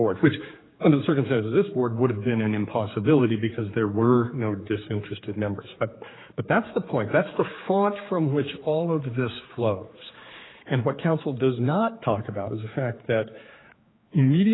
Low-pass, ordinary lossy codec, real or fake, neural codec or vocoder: 7.2 kHz; AAC, 16 kbps; fake; codec, 16 kHz, 4 kbps, X-Codec, HuBERT features, trained on general audio